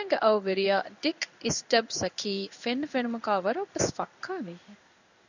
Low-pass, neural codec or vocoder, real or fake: 7.2 kHz; codec, 16 kHz in and 24 kHz out, 1 kbps, XY-Tokenizer; fake